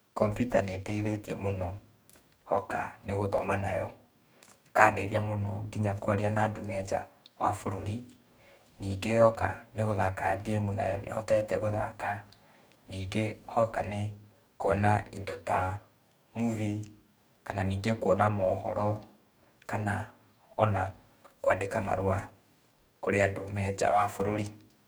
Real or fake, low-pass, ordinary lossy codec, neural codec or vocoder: fake; none; none; codec, 44.1 kHz, 2.6 kbps, DAC